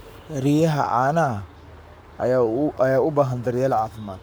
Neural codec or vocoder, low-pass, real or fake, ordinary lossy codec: codec, 44.1 kHz, 7.8 kbps, Pupu-Codec; none; fake; none